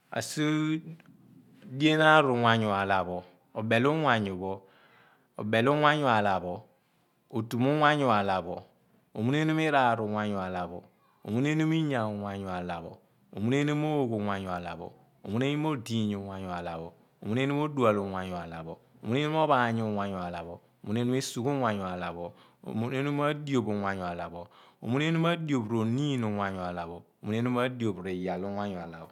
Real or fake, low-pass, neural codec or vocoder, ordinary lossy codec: real; 19.8 kHz; none; none